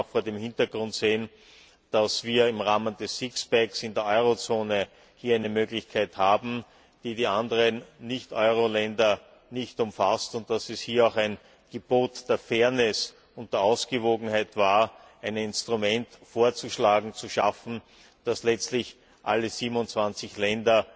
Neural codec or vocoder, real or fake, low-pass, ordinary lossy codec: none; real; none; none